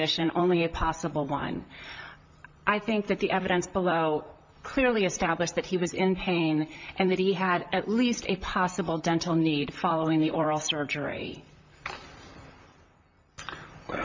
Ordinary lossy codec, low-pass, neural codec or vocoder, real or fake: MP3, 64 kbps; 7.2 kHz; vocoder, 44.1 kHz, 128 mel bands, Pupu-Vocoder; fake